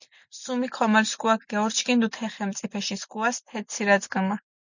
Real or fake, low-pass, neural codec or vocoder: real; 7.2 kHz; none